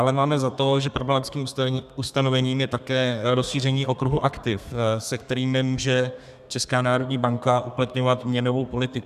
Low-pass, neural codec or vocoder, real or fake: 14.4 kHz; codec, 32 kHz, 1.9 kbps, SNAC; fake